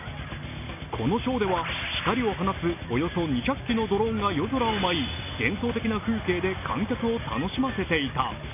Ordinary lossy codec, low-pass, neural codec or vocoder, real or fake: none; 3.6 kHz; none; real